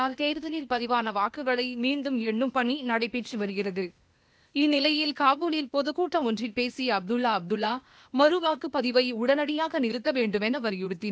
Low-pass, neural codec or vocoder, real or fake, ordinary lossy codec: none; codec, 16 kHz, 0.8 kbps, ZipCodec; fake; none